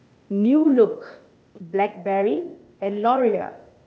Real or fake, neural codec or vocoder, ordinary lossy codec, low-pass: fake; codec, 16 kHz, 0.8 kbps, ZipCodec; none; none